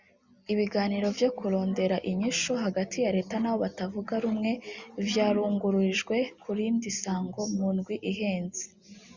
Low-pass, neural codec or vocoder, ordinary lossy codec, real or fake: 7.2 kHz; none; Opus, 64 kbps; real